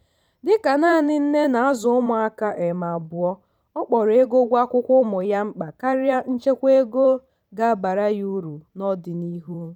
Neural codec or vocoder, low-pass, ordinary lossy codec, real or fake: vocoder, 44.1 kHz, 128 mel bands every 512 samples, BigVGAN v2; 19.8 kHz; none; fake